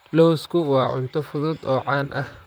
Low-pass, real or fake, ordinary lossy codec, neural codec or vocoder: none; fake; none; vocoder, 44.1 kHz, 128 mel bands, Pupu-Vocoder